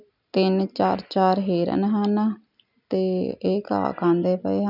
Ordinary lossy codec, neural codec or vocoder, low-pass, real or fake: none; none; 5.4 kHz; real